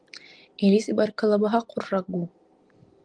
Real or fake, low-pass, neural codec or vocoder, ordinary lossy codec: real; 9.9 kHz; none; Opus, 24 kbps